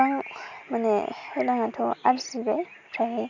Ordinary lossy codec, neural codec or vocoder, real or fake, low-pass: none; none; real; 7.2 kHz